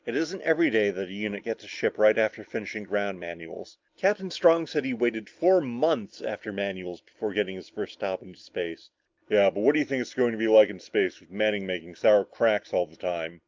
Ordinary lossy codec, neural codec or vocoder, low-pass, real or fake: Opus, 24 kbps; none; 7.2 kHz; real